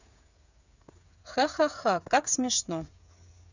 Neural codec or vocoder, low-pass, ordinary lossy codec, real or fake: codec, 16 kHz, 8 kbps, FreqCodec, smaller model; 7.2 kHz; none; fake